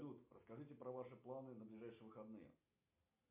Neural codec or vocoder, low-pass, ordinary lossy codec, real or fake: none; 3.6 kHz; MP3, 32 kbps; real